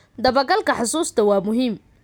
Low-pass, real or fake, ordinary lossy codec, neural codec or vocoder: none; real; none; none